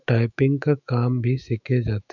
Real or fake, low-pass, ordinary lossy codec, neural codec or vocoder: fake; 7.2 kHz; none; autoencoder, 48 kHz, 128 numbers a frame, DAC-VAE, trained on Japanese speech